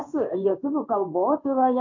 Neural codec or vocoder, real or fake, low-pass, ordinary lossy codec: codec, 16 kHz in and 24 kHz out, 1 kbps, XY-Tokenizer; fake; 7.2 kHz; AAC, 48 kbps